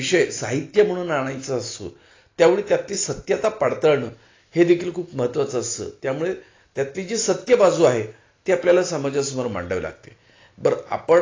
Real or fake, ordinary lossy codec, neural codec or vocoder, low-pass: real; AAC, 32 kbps; none; 7.2 kHz